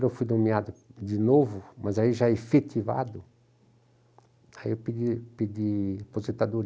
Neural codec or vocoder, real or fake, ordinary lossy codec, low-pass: none; real; none; none